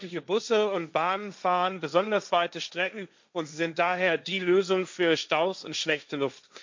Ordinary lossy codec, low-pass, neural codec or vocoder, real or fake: MP3, 64 kbps; 7.2 kHz; codec, 16 kHz, 1.1 kbps, Voila-Tokenizer; fake